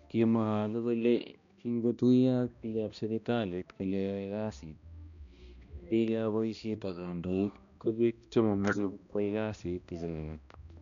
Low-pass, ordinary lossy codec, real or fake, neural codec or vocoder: 7.2 kHz; none; fake; codec, 16 kHz, 1 kbps, X-Codec, HuBERT features, trained on balanced general audio